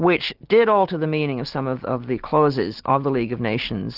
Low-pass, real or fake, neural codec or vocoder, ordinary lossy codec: 5.4 kHz; real; none; Opus, 16 kbps